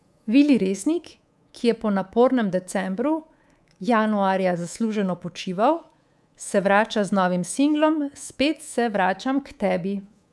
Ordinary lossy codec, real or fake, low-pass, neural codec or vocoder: none; fake; none; codec, 24 kHz, 3.1 kbps, DualCodec